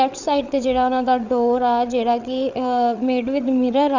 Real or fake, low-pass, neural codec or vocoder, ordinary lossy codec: fake; 7.2 kHz; codec, 16 kHz, 8 kbps, FreqCodec, larger model; none